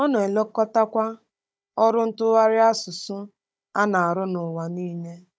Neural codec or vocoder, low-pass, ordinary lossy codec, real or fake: codec, 16 kHz, 16 kbps, FunCodec, trained on Chinese and English, 50 frames a second; none; none; fake